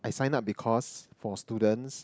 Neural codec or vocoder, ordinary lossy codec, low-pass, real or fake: none; none; none; real